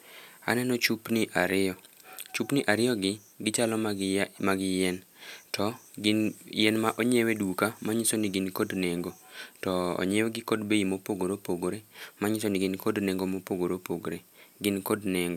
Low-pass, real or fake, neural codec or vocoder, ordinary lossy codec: 19.8 kHz; real; none; none